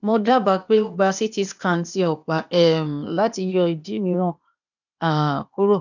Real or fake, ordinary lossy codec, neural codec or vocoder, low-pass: fake; none; codec, 16 kHz, 0.8 kbps, ZipCodec; 7.2 kHz